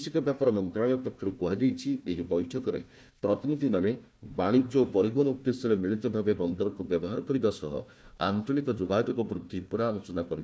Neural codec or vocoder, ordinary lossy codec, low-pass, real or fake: codec, 16 kHz, 1 kbps, FunCodec, trained on Chinese and English, 50 frames a second; none; none; fake